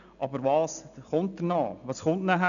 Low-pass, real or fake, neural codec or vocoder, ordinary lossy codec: 7.2 kHz; real; none; none